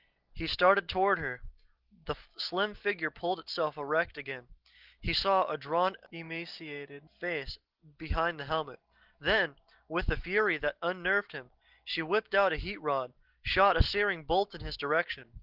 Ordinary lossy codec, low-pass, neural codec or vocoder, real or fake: Opus, 16 kbps; 5.4 kHz; none; real